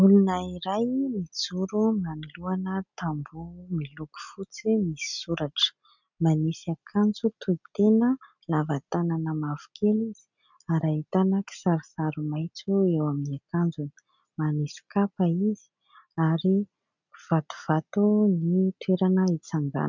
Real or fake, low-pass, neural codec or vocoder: real; 7.2 kHz; none